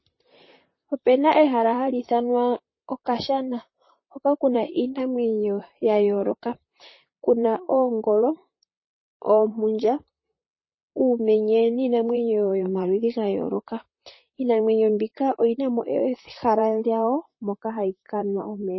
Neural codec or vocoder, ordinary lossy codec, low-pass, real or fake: vocoder, 44.1 kHz, 128 mel bands, Pupu-Vocoder; MP3, 24 kbps; 7.2 kHz; fake